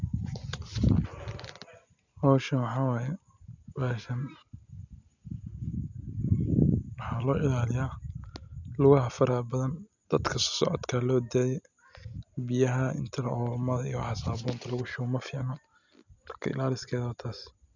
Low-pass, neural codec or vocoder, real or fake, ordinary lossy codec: 7.2 kHz; none; real; none